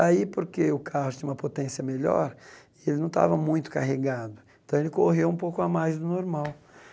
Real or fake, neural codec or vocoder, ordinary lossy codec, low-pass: real; none; none; none